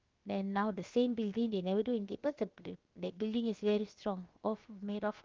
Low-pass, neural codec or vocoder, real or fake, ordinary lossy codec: 7.2 kHz; codec, 16 kHz, 0.7 kbps, FocalCodec; fake; Opus, 32 kbps